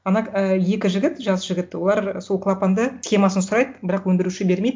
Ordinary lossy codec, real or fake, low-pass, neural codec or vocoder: none; real; none; none